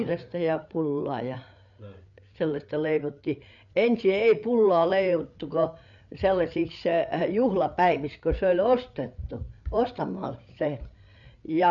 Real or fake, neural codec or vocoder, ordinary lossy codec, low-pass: fake; codec, 16 kHz, 8 kbps, FreqCodec, larger model; none; 7.2 kHz